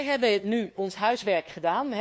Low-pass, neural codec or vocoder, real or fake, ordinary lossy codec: none; codec, 16 kHz, 2 kbps, FunCodec, trained on LibriTTS, 25 frames a second; fake; none